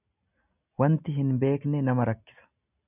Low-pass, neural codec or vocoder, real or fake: 3.6 kHz; none; real